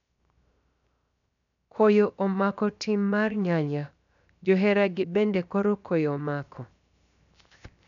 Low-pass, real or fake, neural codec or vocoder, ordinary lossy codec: 7.2 kHz; fake; codec, 16 kHz, 0.7 kbps, FocalCodec; none